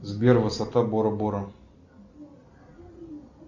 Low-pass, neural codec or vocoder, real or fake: 7.2 kHz; none; real